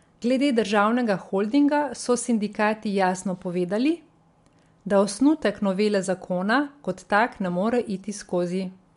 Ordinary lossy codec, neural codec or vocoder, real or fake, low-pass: MP3, 64 kbps; none; real; 10.8 kHz